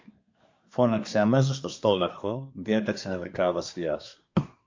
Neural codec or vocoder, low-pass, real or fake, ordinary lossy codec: codec, 24 kHz, 1 kbps, SNAC; 7.2 kHz; fake; MP3, 48 kbps